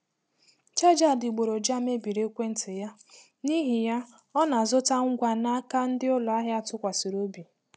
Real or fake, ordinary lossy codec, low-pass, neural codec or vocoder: real; none; none; none